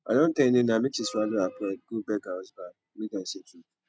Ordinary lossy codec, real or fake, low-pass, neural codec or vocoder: none; real; none; none